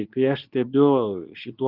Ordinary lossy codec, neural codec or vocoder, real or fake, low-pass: Opus, 24 kbps; codec, 24 kHz, 0.9 kbps, WavTokenizer, medium speech release version 2; fake; 5.4 kHz